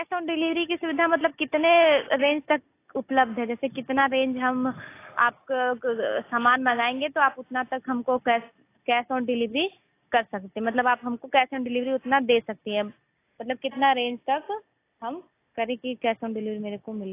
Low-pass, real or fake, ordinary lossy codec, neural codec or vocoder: 3.6 kHz; real; AAC, 24 kbps; none